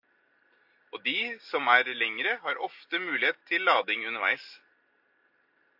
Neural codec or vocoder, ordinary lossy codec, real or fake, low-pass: none; AAC, 48 kbps; real; 5.4 kHz